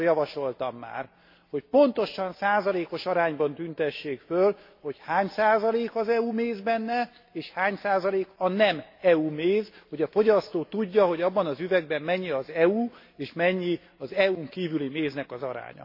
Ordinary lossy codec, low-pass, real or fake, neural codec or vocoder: MP3, 32 kbps; 5.4 kHz; real; none